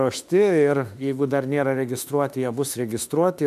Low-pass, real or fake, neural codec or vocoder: 14.4 kHz; fake; autoencoder, 48 kHz, 32 numbers a frame, DAC-VAE, trained on Japanese speech